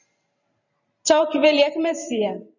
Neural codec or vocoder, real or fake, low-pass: vocoder, 44.1 kHz, 128 mel bands every 512 samples, BigVGAN v2; fake; 7.2 kHz